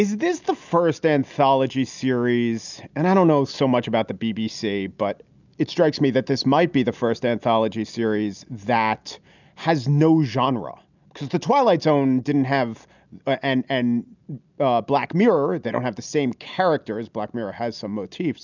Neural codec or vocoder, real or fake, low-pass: none; real; 7.2 kHz